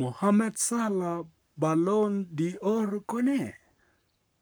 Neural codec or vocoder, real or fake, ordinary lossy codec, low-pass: codec, 44.1 kHz, 7.8 kbps, Pupu-Codec; fake; none; none